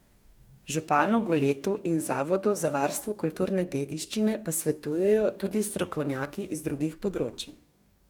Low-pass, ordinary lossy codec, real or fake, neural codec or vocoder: 19.8 kHz; none; fake; codec, 44.1 kHz, 2.6 kbps, DAC